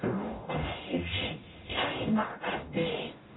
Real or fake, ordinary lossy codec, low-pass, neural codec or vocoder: fake; AAC, 16 kbps; 7.2 kHz; codec, 44.1 kHz, 0.9 kbps, DAC